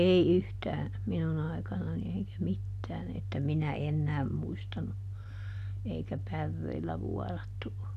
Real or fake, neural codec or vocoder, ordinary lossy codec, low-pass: real; none; none; 14.4 kHz